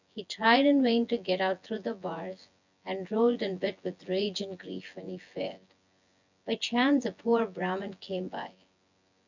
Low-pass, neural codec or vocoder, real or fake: 7.2 kHz; vocoder, 24 kHz, 100 mel bands, Vocos; fake